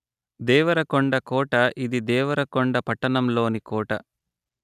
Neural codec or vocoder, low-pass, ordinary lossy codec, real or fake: none; 14.4 kHz; none; real